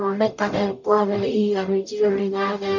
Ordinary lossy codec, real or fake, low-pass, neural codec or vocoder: none; fake; 7.2 kHz; codec, 44.1 kHz, 0.9 kbps, DAC